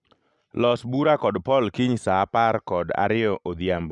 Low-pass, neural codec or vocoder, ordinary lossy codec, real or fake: 10.8 kHz; none; none; real